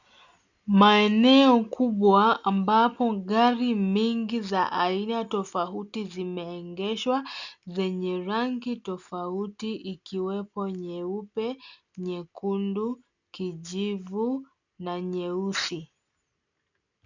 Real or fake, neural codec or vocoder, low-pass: real; none; 7.2 kHz